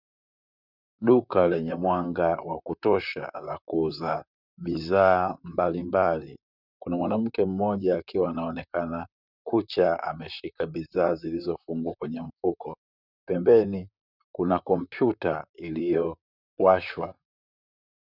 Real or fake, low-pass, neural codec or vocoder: fake; 5.4 kHz; vocoder, 44.1 kHz, 128 mel bands, Pupu-Vocoder